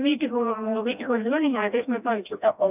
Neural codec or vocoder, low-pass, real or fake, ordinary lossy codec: codec, 16 kHz, 1 kbps, FreqCodec, smaller model; 3.6 kHz; fake; none